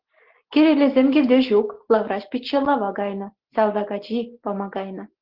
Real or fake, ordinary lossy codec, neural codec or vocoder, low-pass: real; Opus, 16 kbps; none; 5.4 kHz